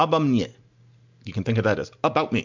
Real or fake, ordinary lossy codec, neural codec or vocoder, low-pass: real; MP3, 64 kbps; none; 7.2 kHz